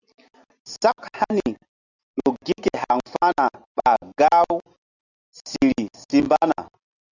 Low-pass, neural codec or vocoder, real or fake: 7.2 kHz; none; real